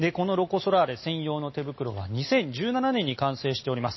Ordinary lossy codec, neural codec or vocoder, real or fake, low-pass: MP3, 24 kbps; none; real; 7.2 kHz